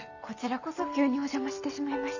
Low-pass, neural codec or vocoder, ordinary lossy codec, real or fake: 7.2 kHz; none; none; real